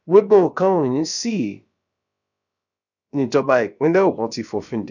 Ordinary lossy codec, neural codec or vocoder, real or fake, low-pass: none; codec, 16 kHz, about 1 kbps, DyCAST, with the encoder's durations; fake; 7.2 kHz